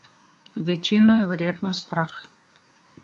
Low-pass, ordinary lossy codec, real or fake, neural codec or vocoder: 10.8 kHz; AAC, 64 kbps; fake; codec, 24 kHz, 1 kbps, SNAC